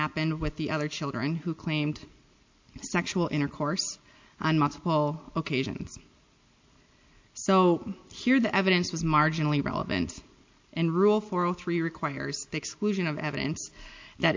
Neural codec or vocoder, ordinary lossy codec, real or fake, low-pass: none; MP3, 48 kbps; real; 7.2 kHz